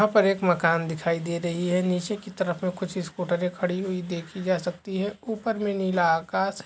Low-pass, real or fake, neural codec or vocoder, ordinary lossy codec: none; real; none; none